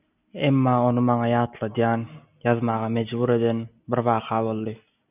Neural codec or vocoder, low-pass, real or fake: none; 3.6 kHz; real